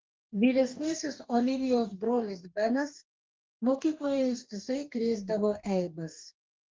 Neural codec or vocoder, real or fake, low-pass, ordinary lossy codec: codec, 44.1 kHz, 2.6 kbps, DAC; fake; 7.2 kHz; Opus, 24 kbps